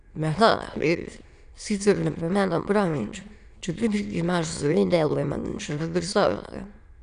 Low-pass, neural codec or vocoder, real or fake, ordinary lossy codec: 9.9 kHz; autoencoder, 22.05 kHz, a latent of 192 numbers a frame, VITS, trained on many speakers; fake; none